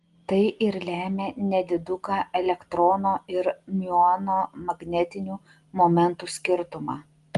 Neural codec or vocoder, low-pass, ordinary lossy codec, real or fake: none; 10.8 kHz; Opus, 24 kbps; real